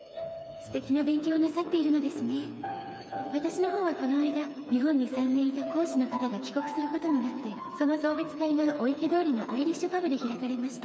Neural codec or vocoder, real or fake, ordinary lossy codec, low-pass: codec, 16 kHz, 4 kbps, FreqCodec, smaller model; fake; none; none